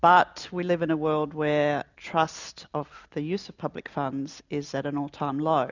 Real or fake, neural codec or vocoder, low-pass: real; none; 7.2 kHz